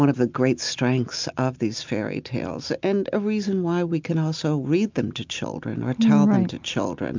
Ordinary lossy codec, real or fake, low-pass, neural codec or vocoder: MP3, 64 kbps; real; 7.2 kHz; none